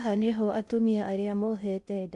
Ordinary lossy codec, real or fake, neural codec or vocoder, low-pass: MP3, 64 kbps; fake; codec, 16 kHz in and 24 kHz out, 0.6 kbps, FocalCodec, streaming, 4096 codes; 10.8 kHz